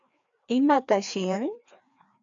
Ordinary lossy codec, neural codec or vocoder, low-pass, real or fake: AAC, 64 kbps; codec, 16 kHz, 2 kbps, FreqCodec, larger model; 7.2 kHz; fake